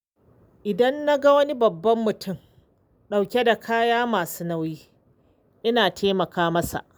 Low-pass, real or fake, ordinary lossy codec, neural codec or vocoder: none; real; none; none